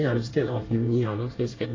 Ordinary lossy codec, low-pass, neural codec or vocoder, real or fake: AAC, 32 kbps; 7.2 kHz; codec, 24 kHz, 1 kbps, SNAC; fake